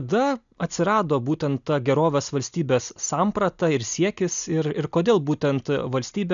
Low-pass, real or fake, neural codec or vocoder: 7.2 kHz; real; none